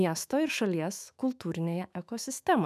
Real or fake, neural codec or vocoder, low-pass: fake; autoencoder, 48 kHz, 128 numbers a frame, DAC-VAE, trained on Japanese speech; 14.4 kHz